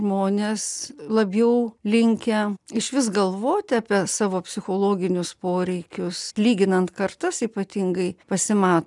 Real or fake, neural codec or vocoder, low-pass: real; none; 10.8 kHz